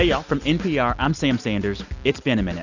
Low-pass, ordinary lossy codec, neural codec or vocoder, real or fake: 7.2 kHz; Opus, 64 kbps; none; real